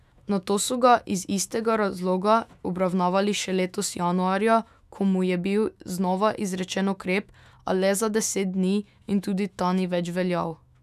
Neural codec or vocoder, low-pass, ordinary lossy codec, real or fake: autoencoder, 48 kHz, 128 numbers a frame, DAC-VAE, trained on Japanese speech; 14.4 kHz; none; fake